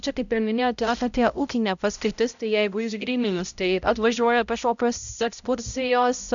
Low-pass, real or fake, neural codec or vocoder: 7.2 kHz; fake; codec, 16 kHz, 0.5 kbps, X-Codec, HuBERT features, trained on balanced general audio